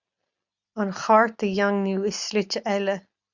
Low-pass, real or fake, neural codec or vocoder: 7.2 kHz; real; none